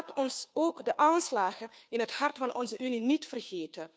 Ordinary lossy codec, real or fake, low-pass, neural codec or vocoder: none; fake; none; codec, 16 kHz, 2 kbps, FunCodec, trained on Chinese and English, 25 frames a second